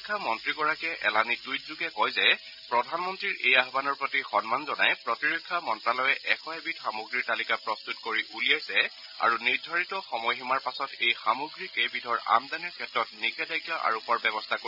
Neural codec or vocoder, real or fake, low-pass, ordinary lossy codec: none; real; 5.4 kHz; none